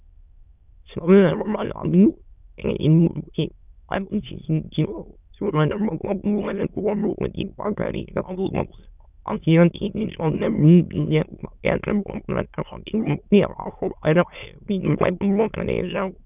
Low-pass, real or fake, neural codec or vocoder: 3.6 kHz; fake; autoencoder, 22.05 kHz, a latent of 192 numbers a frame, VITS, trained on many speakers